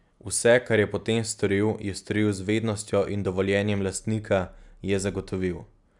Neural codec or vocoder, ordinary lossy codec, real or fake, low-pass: none; none; real; 10.8 kHz